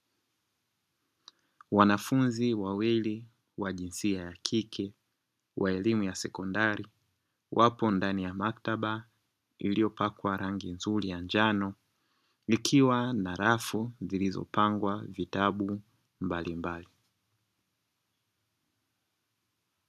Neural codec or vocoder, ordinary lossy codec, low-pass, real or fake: none; AAC, 96 kbps; 14.4 kHz; real